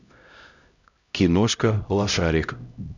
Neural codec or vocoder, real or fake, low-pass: codec, 16 kHz, 0.5 kbps, X-Codec, HuBERT features, trained on LibriSpeech; fake; 7.2 kHz